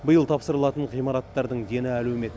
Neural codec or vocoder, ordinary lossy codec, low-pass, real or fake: none; none; none; real